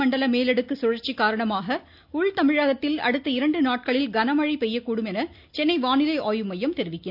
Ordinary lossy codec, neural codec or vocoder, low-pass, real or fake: none; none; 5.4 kHz; real